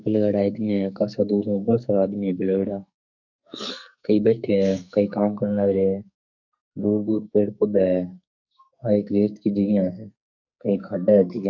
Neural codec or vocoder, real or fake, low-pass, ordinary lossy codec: codec, 44.1 kHz, 2.6 kbps, SNAC; fake; 7.2 kHz; none